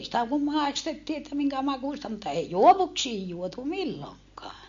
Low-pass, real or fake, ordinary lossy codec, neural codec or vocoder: 7.2 kHz; real; MP3, 48 kbps; none